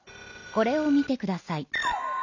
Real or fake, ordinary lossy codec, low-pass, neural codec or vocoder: real; none; 7.2 kHz; none